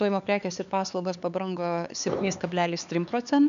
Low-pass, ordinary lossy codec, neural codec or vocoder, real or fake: 7.2 kHz; AAC, 96 kbps; codec, 16 kHz, 4 kbps, X-Codec, HuBERT features, trained on LibriSpeech; fake